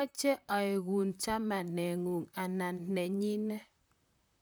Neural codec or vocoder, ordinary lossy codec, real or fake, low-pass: vocoder, 44.1 kHz, 128 mel bands, Pupu-Vocoder; none; fake; none